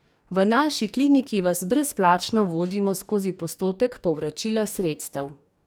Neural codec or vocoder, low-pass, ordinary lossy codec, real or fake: codec, 44.1 kHz, 2.6 kbps, DAC; none; none; fake